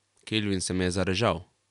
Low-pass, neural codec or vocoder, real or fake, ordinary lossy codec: 10.8 kHz; none; real; none